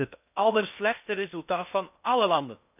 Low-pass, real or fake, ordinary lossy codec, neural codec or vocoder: 3.6 kHz; fake; none; codec, 16 kHz in and 24 kHz out, 0.6 kbps, FocalCodec, streaming, 2048 codes